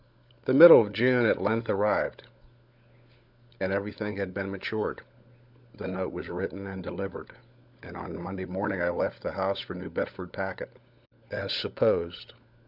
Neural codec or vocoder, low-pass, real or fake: codec, 16 kHz, 16 kbps, FunCodec, trained on LibriTTS, 50 frames a second; 5.4 kHz; fake